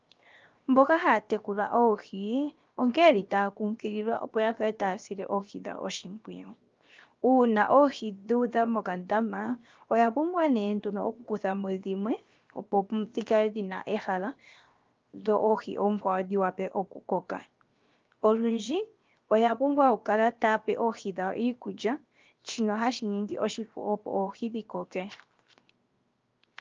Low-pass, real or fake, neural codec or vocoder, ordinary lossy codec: 7.2 kHz; fake; codec, 16 kHz, 0.7 kbps, FocalCodec; Opus, 24 kbps